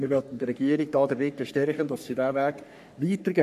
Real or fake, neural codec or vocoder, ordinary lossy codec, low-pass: fake; codec, 44.1 kHz, 3.4 kbps, Pupu-Codec; none; 14.4 kHz